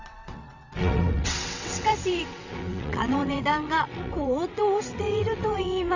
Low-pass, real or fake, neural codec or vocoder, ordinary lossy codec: 7.2 kHz; fake; vocoder, 22.05 kHz, 80 mel bands, WaveNeXt; none